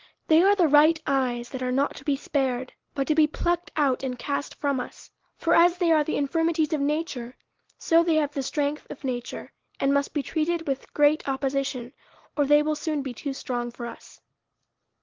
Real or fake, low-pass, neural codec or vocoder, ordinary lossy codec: real; 7.2 kHz; none; Opus, 16 kbps